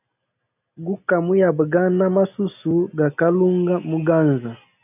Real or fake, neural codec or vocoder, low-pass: real; none; 3.6 kHz